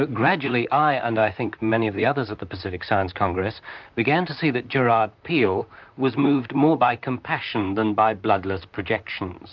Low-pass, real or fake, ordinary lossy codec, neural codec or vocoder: 7.2 kHz; fake; MP3, 64 kbps; vocoder, 44.1 kHz, 128 mel bands, Pupu-Vocoder